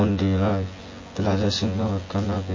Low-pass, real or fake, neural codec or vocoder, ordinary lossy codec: 7.2 kHz; fake; vocoder, 24 kHz, 100 mel bands, Vocos; MP3, 32 kbps